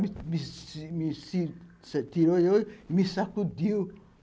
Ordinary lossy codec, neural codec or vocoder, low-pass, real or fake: none; none; none; real